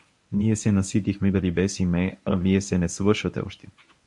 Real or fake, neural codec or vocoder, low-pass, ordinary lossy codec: fake; codec, 24 kHz, 0.9 kbps, WavTokenizer, medium speech release version 1; 10.8 kHz; MP3, 64 kbps